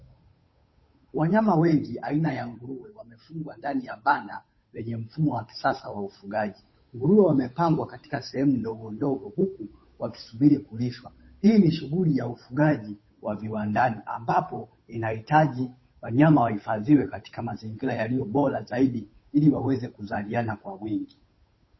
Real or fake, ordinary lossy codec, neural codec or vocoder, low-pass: fake; MP3, 24 kbps; codec, 16 kHz, 8 kbps, FunCodec, trained on Chinese and English, 25 frames a second; 7.2 kHz